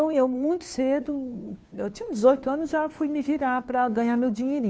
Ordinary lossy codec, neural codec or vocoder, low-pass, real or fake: none; codec, 16 kHz, 2 kbps, FunCodec, trained on Chinese and English, 25 frames a second; none; fake